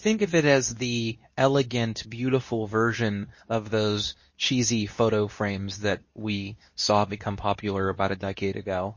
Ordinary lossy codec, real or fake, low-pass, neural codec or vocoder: MP3, 32 kbps; fake; 7.2 kHz; codec, 24 kHz, 0.9 kbps, WavTokenizer, medium speech release version 2